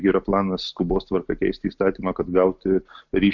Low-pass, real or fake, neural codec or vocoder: 7.2 kHz; real; none